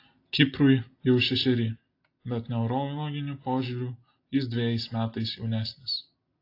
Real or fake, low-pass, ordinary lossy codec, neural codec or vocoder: real; 5.4 kHz; AAC, 32 kbps; none